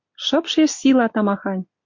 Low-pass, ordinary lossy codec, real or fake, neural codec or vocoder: 7.2 kHz; MP3, 48 kbps; real; none